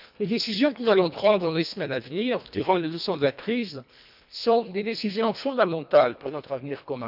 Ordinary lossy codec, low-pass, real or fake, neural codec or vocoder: none; 5.4 kHz; fake; codec, 24 kHz, 1.5 kbps, HILCodec